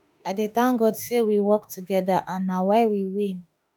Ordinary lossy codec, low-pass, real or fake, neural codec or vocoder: none; none; fake; autoencoder, 48 kHz, 32 numbers a frame, DAC-VAE, trained on Japanese speech